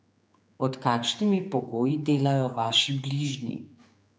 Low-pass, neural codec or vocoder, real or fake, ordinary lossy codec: none; codec, 16 kHz, 4 kbps, X-Codec, HuBERT features, trained on general audio; fake; none